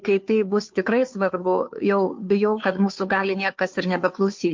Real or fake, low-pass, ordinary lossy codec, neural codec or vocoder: fake; 7.2 kHz; MP3, 48 kbps; codec, 16 kHz, 2 kbps, FunCodec, trained on Chinese and English, 25 frames a second